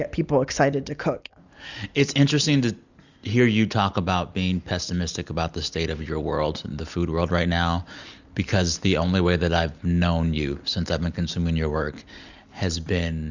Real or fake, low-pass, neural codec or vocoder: real; 7.2 kHz; none